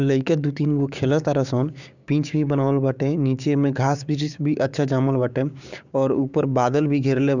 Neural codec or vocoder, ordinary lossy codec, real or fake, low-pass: codec, 16 kHz, 6 kbps, DAC; none; fake; 7.2 kHz